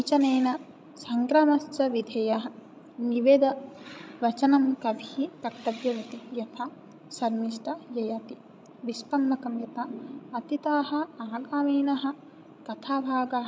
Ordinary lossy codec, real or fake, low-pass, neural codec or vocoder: none; fake; none; codec, 16 kHz, 16 kbps, FreqCodec, larger model